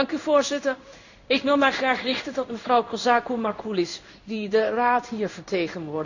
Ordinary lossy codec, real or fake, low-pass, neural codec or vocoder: none; fake; 7.2 kHz; codec, 16 kHz in and 24 kHz out, 1 kbps, XY-Tokenizer